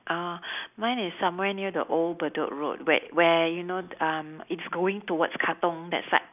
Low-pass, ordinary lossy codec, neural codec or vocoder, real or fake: 3.6 kHz; none; none; real